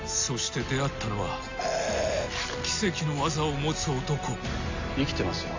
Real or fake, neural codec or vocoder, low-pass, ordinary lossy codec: real; none; 7.2 kHz; AAC, 48 kbps